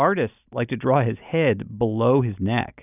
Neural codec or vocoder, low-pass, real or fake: none; 3.6 kHz; real